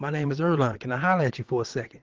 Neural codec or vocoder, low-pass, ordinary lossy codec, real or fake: vocoder, 22.05 kHz, 80 mel bands, WaveNeXt; 7.2 kHz; Opus, 16 kbps; fake